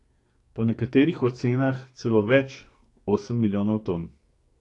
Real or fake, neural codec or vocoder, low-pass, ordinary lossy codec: fake; codec, 44.1 kHz, 2.6 kbps, SNAC; 10.8 kHz; AAC, 48 kbps